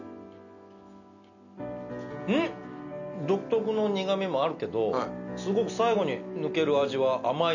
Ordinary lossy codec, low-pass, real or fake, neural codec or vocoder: none; 7.2 kHz; real; none